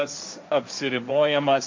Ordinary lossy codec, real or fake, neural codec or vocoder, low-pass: MP3, 48 kbps; fake; codec, 16 kHz, 1.1 kbps, Voila-Tokenizer; 7.2 kHz